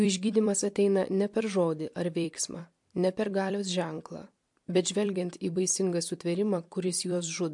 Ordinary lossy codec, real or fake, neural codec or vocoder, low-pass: MP3, 64 kbps; fake; vocoder, 44.1 kHz, 128 mel bands every 256 samples, BigVGAN v2; 10.8 kHz